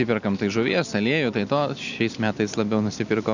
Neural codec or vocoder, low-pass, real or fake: none; 7.2 kHz; real